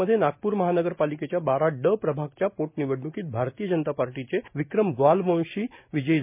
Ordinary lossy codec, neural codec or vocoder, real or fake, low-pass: MP3, 32 kbps; none; real; 3.6 kHz